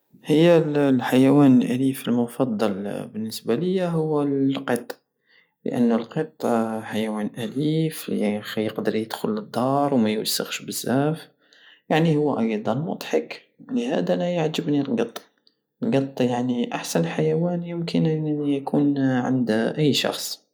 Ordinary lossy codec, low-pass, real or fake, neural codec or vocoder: none; none; real; none